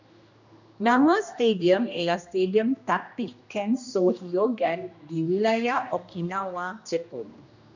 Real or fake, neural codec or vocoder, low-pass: fake; codec, 16 kHz, 1 kbps, X-Codec, HuBERT features, trained on general audio; 7.2 kHz